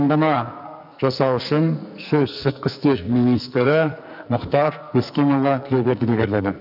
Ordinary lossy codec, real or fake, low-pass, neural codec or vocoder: none; fake; 5.4 kHz; codec, 44.1 kHz, 2.6 kbps, SNAC